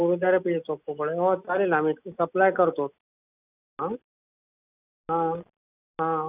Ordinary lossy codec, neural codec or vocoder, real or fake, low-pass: none; none; real; 3.6 kHz